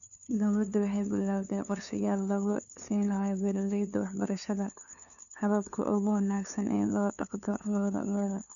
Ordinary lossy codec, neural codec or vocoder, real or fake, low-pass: none; codec, 16 kHz, 2 kbps, FunCodec, trained on LibriTTS, 25 frames a second; fake; 7.2 kHz